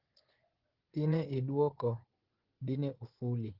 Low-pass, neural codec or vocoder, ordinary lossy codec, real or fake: 5.4 kHz; vocoder, 24 kHz, 100 mel bands, Vocos; Opus, 16 kbps; fake